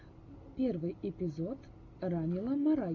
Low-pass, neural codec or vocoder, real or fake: 7.2 kHz; none; real